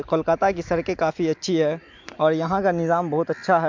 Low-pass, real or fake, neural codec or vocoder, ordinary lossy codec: 7.2 kHz; real; none; MP3, 64 kbps